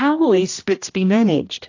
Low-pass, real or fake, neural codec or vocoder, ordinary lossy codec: 7.2 kHz; fake; codec, 16 kHz, 1 kbps, X-Codec, HuBERT features, trained on general audio; AAC, 48 kbps